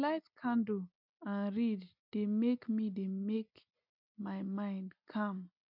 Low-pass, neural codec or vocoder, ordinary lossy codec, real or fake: 5.4 kHz; none; none; real